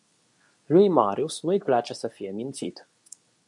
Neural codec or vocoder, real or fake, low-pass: codec, 24 kHz, 0.9 kbps, WavTokenizer, medium speech release version 2; fake; 10.8 kHz